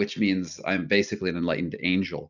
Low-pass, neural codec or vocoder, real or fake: 7.2 kHz; none; real